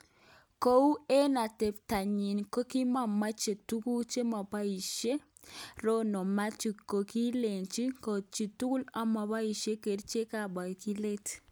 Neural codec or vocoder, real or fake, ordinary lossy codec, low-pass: none; real; none; none